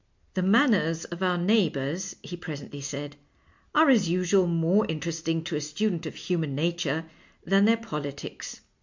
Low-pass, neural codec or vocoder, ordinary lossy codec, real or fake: 7.2 kHz; none; MP3, 64 kbps; real